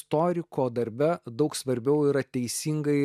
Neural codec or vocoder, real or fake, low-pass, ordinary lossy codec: none; real; 14.4 kHz; MP3, 96 kbps